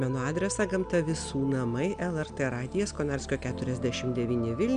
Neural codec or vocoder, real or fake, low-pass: none; real; 9.9 kHz